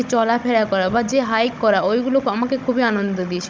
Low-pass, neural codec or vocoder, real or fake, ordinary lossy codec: none; codec, 16 kHz, 16 kbps, FunCodec, trained on Chinese and English, 50 frames a second; fake; none